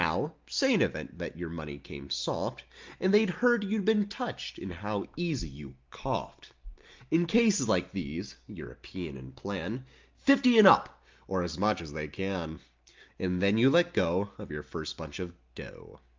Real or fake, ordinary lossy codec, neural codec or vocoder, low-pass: real; Opus, 24 kbps; none; 7.2 kHz